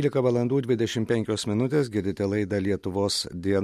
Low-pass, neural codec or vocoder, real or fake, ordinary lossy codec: 14.4 kHz; none; real; MP3, 64 kbps